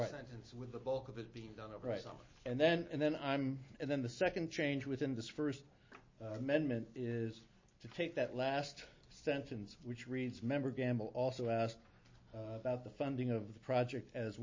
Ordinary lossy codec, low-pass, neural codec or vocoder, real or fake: MP3, 32 kbps; 7.2 kHz; none; real